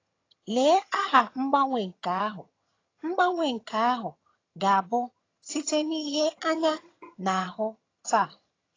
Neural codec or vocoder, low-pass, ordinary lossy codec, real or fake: vocoder, 22.05 kHz, 80 mel bands, HiFi-GAN; 7.2 kHz; AAC, 32 kbps; fake